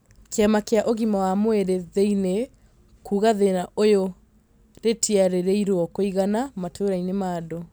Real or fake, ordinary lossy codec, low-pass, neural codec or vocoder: real; none; none; none